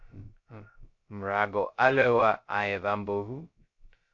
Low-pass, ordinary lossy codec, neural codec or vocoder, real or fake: 7.2 kHz; AAC, 48 kbps; codec, 16 kHz, 0.2 kbps, FocalCodec; fake